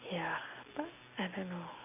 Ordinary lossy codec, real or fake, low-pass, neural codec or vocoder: none; real; 3.6 kHz; none